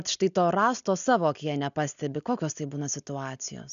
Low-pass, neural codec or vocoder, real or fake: 7.2 kHz; none; real